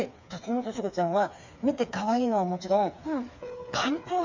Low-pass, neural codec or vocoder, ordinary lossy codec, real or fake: 7.2 kHz; codec, 16 kHz, 4 kbps, FreqCodec, smaller model; MP3, 64 kbps; fake